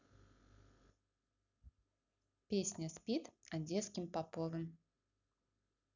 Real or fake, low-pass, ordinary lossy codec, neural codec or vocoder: real; 7.2 kHz; none; none